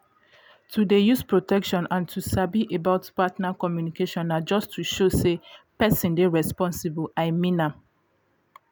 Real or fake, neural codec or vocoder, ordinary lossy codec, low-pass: real; none; none; none